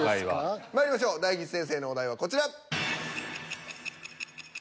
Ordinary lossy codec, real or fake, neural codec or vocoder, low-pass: none; real; none; none